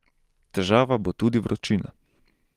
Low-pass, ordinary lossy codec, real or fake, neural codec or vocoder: 14.4 kHz; Opus, 24 kbps; real; none